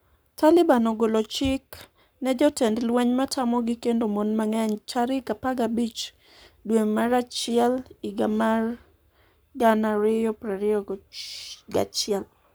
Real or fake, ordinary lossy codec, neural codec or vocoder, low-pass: fake; none; codec, 44.1 kHz, 7.8 kbps, Pupu-Codec; none